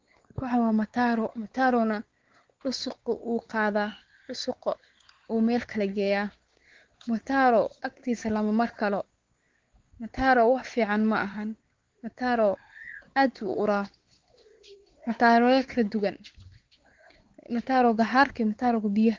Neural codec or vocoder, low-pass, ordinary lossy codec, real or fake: codec, 16 kHz, 4 kbps, X-Codec, WavLM features, trained on Multilingual LibriSpeech; 7.2 kHz; Opus, 16 kbps; fake